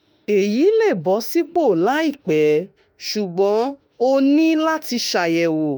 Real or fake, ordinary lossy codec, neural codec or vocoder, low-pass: fake; none; autoencoder, 48 kHz, 32 numbers a frame, DAC-VAE, trained on Japanese speech; none